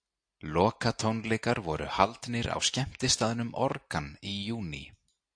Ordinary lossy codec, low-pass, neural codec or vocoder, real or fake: AAC, 64 kbps; 9.9 kHz; none; real